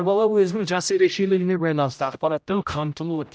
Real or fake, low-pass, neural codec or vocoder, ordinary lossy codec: fake; none; codec, 16 kHz, 0.5 kbps, X-Codec, HuBERT features, trained on general audio; none